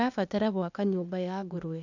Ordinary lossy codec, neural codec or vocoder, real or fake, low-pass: none; codec, 16 kHz, 0.8 kbps, ZipCodec; fake; 7.2 kHz